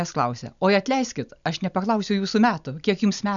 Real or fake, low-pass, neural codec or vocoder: real; 7.2 kHz; none